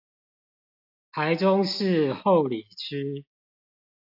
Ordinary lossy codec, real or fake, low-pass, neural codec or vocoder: AAC, 48 kbps; real; 5.4 kHz; none